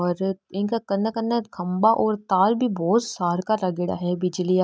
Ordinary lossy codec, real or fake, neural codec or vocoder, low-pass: none; real; none; none